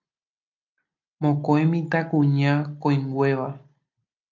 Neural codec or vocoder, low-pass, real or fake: none; 7.2 kHz; real